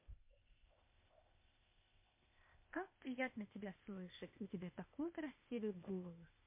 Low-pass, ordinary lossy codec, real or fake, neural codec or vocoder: 3.6 kHz; MP3, 24 kbps; fake; codec, 16 kHz, 0.8 kbps, ZipCodec